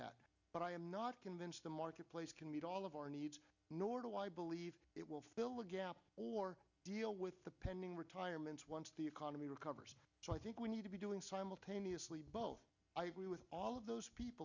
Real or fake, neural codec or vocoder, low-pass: real; none; 7.2 kHz